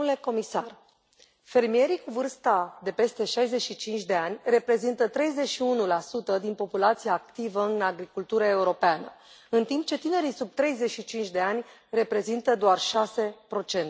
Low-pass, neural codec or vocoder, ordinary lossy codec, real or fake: none; none; none; real